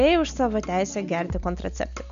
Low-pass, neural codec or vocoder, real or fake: 7.2 kHz; none; real